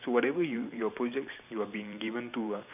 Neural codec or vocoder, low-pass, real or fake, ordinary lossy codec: vocoder, 44.1 kHz, 128 mel bands every 512 samples, BigVGAN v2; 3.6 kHz; fake; none